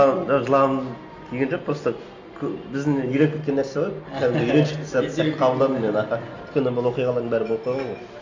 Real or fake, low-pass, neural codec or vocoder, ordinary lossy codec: real; 7.2 kHz; none; AAC, 48 kbps